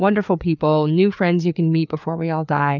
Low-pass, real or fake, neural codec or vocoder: 7.2 kHz; fake; codec, 16 kHz, 4 kbps, FreqCodec, larger model